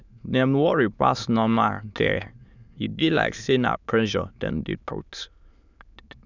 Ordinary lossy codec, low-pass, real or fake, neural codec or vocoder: none; 7.2 kHz; fake; autoencoder, 22.05 kHz, a latent of 192 numbers a frame, VITS, trained on many speakers